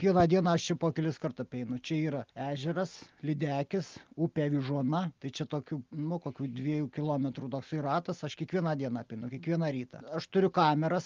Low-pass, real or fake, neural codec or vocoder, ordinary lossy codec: 7.2 kHz; real; none; Opus, 32 kbps